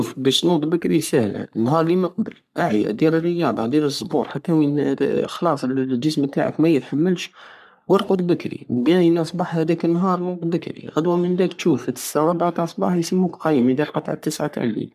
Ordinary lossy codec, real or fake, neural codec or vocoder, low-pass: none; fake; codec, 44.1 kHz, 3.4 kbps, Pupu-Codec; 14.4 kHz